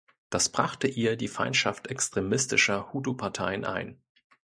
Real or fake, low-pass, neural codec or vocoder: real; 9.9 kHz; none